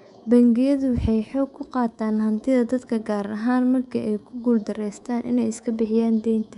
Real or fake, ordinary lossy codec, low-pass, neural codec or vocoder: fake; none; 10.8 kHz; codec, 24 kHz, 3.1 kbps, DualCodec